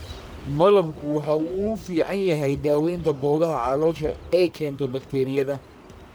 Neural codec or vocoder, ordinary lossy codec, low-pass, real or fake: codec, 44.1 kHz, 1.7 kbps, Pupu-Codec; none; none; fake